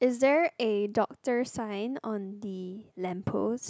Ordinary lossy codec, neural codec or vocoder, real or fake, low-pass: none; none; real; none